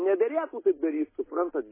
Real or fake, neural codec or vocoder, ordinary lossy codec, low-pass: real; none; MP3, 16 kbps; 3.6 kHz